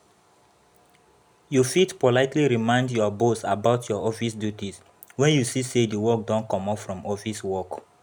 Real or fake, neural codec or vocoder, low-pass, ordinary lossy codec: real; none; none; none